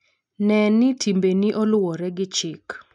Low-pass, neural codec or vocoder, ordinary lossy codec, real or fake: 10.8 kHz; none; none; real